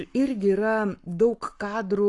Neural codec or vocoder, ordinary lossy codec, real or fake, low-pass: codec, 44.1 kHz, 7.8 kbps, Pupu-Codec; AAC, 48 kbps; fake; 10.8 kHz